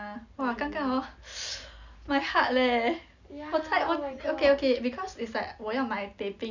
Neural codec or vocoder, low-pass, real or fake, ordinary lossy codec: none; 7.2 kHz; real; none